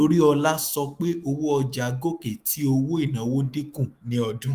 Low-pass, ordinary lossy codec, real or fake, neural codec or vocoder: 14.4 kHz; Opus, 32 kbps; fake; autoencoder, 48 kHz, 128 numbers a frame, DAC-VAE, trained on Japanese speech